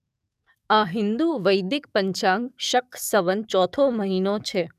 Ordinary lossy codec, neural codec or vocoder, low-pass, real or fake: none; codec, 44.1 kHz, 7.8 kbps, DAC; 14.4 kHz; fake